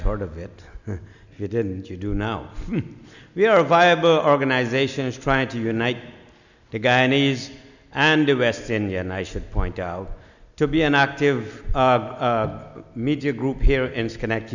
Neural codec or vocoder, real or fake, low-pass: none; real; 7.2 kHz